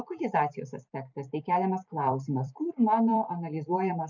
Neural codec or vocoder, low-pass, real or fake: vocoder, 44.1 kHz, 128 mel bands every 256 samples, BigVGAN v2; 7.2 kHz; fake